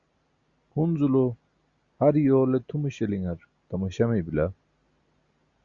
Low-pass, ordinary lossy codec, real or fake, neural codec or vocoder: 7.2 kHz; Opus, 64 kbps; real; none